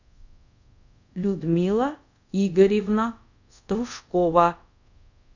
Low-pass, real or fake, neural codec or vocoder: 7.2 kHz; fake; codec, 24 kHz, 0.5 kbps, DualCodec